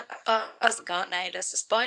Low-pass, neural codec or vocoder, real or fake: 10.8 kHz; codec, 24 kHz, 0.9 kbps, WavTokenizer, small release; fake